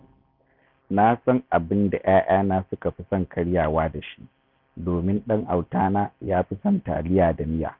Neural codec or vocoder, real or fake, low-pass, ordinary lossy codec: none; real; 5.4 kHz; none